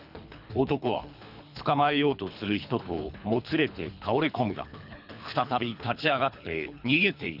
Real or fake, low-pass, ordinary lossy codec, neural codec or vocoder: fake; 5.4 kHz; none; codec, 24 kHz, 3 kbps, HILCodec